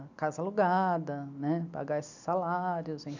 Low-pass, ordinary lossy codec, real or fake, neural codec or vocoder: 7.2 kHz; none; real; none